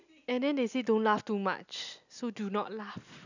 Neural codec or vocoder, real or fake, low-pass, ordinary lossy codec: none; real; 7.2 kHz; none